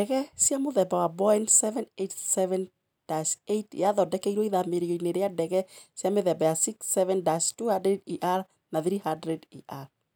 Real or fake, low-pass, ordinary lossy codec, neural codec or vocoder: real; none; none; none